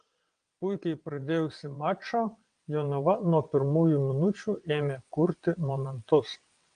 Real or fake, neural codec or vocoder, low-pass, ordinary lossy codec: fake; vocoder, 22.05 kHz, 80 mel bands, WaveNeXt; 9.9 kHz; Opus, 32 kbps